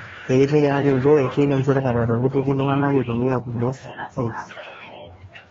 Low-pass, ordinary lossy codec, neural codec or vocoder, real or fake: 7.2 kHz; AAC, 24 kbps; codec, 16 kHz, 1 kbps, FreqCodec, larger model; fake